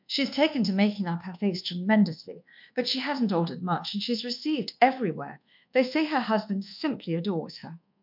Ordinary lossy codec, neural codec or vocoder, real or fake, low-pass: MP3, 48 kbps; codec, 24 kHz, 1.2 kbps, DualCodec; fake; 5.4 kHz